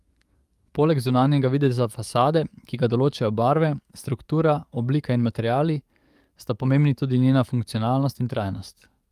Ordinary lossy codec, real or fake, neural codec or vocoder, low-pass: Opus, 32 kbps; fake; codec, 44.1 kHz, 7.8 kbps, DAC; 14.4 kHz